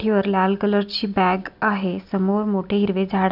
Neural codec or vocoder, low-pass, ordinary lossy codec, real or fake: none; 5.4 kHz; none; real